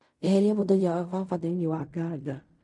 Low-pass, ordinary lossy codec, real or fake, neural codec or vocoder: 10.8 kHz; MP3, 48 kbps; fake; codec, 16 kHz in and 24 kHz out, 0.4 kbps, LongCat-Audio-Codec, fine tuned four codebook decoder